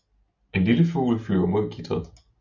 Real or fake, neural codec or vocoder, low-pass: real; none; 7.2 kHz